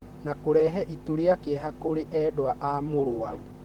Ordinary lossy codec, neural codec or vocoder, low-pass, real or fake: Opus, 16 kbps; vocoder, 44.1 kHz, 128 mel bands, Pupu-Vocoder; 19.8 kHz; fake